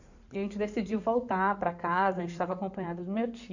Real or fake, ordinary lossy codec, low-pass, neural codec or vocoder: fake; none; 7.2 kHz; codec, 16 kHz in and 24 kHz out, 2.2 kbps, FireRedTTS-2 codec